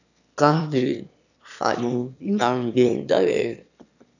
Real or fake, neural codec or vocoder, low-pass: fake; autoencoder, 22.05 kHz, a latent of 192 numbers a frame, VITS, trained on one speaker; 7.2 kHz